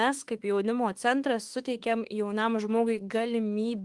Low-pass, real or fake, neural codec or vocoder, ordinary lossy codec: 10.8 kHz; fake; autoencoder, 48 kHz, 32 numbers a frame, DAC-VAE, trained on Japanese speech; Opus, 24 kbps